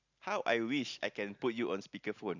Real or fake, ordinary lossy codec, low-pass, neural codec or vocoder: real; none; 7.2 kHz; none